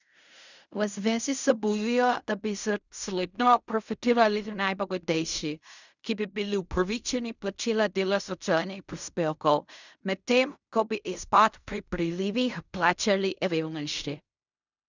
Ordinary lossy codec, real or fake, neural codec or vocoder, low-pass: none; fake; codec, 16 kHz in and 24 kHz out, 0.4 kbps, LongCat-Audio-Codec, fine tuned four codebook decoder; 7.2 kHz